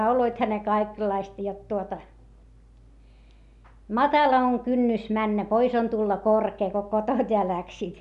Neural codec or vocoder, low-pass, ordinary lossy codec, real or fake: none; 10.8 kHz; none; real